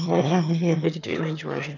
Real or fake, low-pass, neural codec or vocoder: fake; 7.2 kHz; autoencoder, 22.05 kHz, a latent of 192 numbers a frame, VITS, trained on one speaker